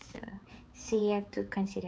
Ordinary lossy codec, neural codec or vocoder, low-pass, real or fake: none; codec, 16 kHz, 4 kbps, X-Codec, HuBERT features, trained on balanced general audio; none; fake